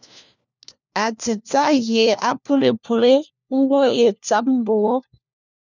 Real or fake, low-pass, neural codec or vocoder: fake; 7.2 kHz; codec, 16 kHz, 1 kbps, FunCodec, trained on LibriTTS, 50 frames a second